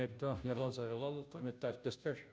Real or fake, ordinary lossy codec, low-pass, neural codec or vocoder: fake; none; none; codec, 16 kHz, 0.5 kbps, FunCodec, trained on Chinese and English, 25 frames a second